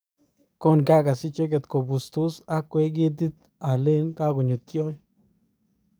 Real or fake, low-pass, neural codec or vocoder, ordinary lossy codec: fake; none; codec, 44.1 kHz, 7.8 kbps, DAC; none